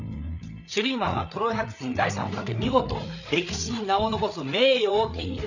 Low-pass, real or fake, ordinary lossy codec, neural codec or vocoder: 7.2 kHz; fake; none; codec, 16 kHz, 8 kbps, FreqCodec, larger model